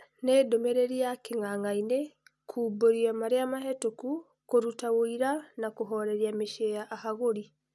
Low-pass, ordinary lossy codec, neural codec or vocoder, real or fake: none; none; none; real